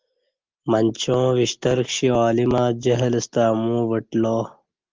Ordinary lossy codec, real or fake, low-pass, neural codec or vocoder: Opus, 32 kbps; real; 7.2 kHz; none